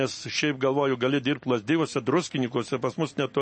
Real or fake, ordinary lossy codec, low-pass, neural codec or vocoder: real; MP3, 32 kbps; 10.8 kHz; none